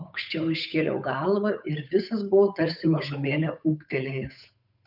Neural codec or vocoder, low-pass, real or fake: codec, 16 kHz, 8 kbps, FunCodec, trained on Chinese and English, 25 frames a second; 5.4 kHz; fake